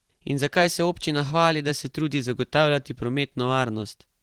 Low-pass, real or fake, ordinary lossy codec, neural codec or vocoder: 19.8 kHz; fake; Opus, 16 kbps; vocoder, 44.1 kHz, 128 mel bands every 512 samples, BigVGAN v2